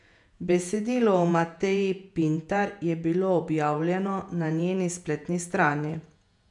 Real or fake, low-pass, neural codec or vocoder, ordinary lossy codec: fake; 10.8 kHz; vocoder, 48 kHz, 128 mel bands, Vocos; none